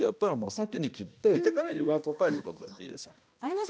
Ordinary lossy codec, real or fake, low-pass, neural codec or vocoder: none; fake; none; codec, 16 kHz, 1 kbps, X-Codec, HuBERT features, trained on balanced general audio